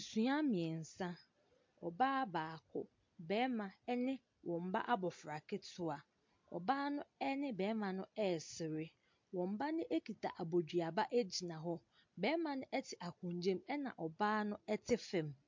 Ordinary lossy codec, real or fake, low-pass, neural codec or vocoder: MP3, 48 kbps; real; 7.2 kHz; none